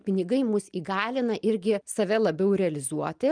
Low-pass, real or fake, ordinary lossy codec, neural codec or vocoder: 9.9 kHz; real; Opus, 32 kbps; none